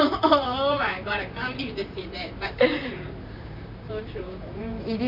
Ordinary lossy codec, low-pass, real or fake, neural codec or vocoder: none; 5.4 kHz; fake; vocoder, 44.1 kHz, 128 mel bands, Pupu-Vocoder